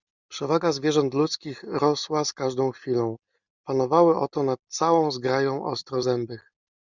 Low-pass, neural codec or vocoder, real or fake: 7.2 kHz; none; real